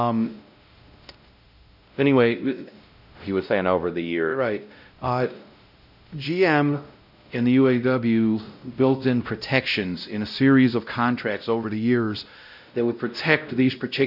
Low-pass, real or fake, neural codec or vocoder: 5.4 kHz; fake; codec, 16 kHz, 0.5 kbps, X-Codec, WavLM features, trained on Multilingual LibriSpeech